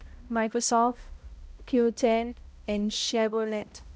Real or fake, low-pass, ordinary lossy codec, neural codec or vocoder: fake; none; none; codec, 16 kHz, 0.5 kbps, X-Codec, HuBERT features, trained on balanced general audio